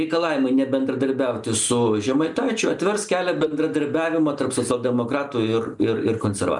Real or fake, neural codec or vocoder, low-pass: real; none; 10.8 kHz